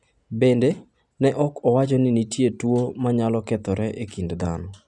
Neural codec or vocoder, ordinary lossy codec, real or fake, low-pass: none; none; real; 10.8 kHz